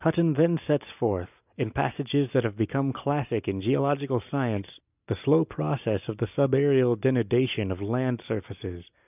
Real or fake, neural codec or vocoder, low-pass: real; none; 3.6 kHz